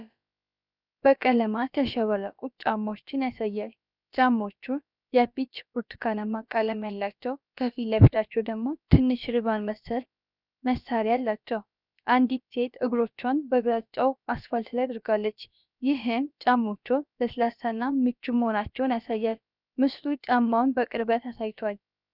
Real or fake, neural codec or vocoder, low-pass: fake; codec, 16 kHz, about 1 kbps, DyCAST, with the encoder's durations; 5.4 kHz